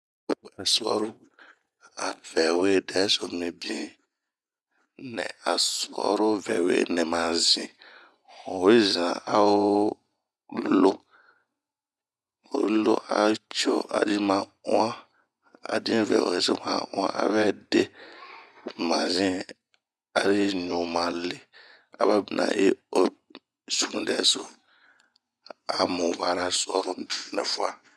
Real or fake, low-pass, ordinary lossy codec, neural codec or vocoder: fake; none; none; vocoder, 24 kHz, 100 mel bands, Vocos